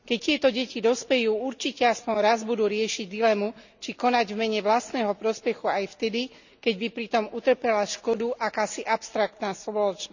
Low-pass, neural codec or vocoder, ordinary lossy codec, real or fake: 7.2 kHz; none; none; real